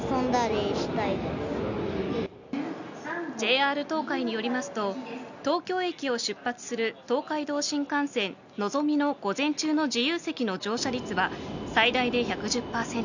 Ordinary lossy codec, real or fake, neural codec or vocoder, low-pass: none; real; none; 7.2 kHz